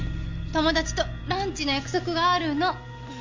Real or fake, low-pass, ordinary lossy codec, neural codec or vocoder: real; 7.2 kHz; MP3, 64 kbps; none